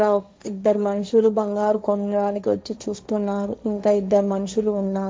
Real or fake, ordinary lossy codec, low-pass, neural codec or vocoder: fake; none; none; codec, 16 kHz, 1.1 kbps, Voila-Tokenizer